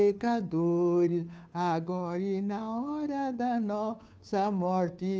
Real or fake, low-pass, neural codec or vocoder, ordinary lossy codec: fake; none; codec, 16 kHz, 2 kbps, FunCodec, trained on Chinese and English, 25 frames a second; none